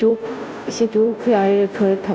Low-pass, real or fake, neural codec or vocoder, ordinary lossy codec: none; fake; codec, 16 kHz, 0.5 kbps, FunCodec, trained on Chinese and English, 25 frames a second; none